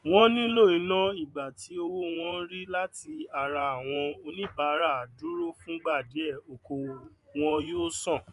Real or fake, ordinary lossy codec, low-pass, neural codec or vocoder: fake; none; 10.8 kHz; vocoder, 24 kHz, 100 mel bands, Vocos